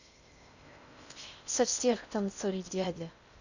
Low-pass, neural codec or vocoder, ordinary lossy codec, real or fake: 7.2 kHz; codec, 16 kHz in and 24 kHz out, 0.6 kbps, FocalCodec, streaming, 4096 codes; none; fake